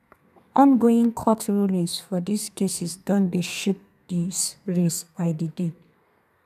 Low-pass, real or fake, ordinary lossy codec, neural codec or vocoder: 14.4 kHz; fake; none; codec, 32 kHz, 1.9 kbps, SNAC